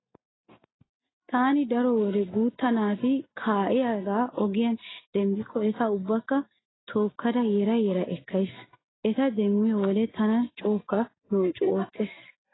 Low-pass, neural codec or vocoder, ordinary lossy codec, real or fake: 7.2 kHz; none; AAC, 16 kbps; real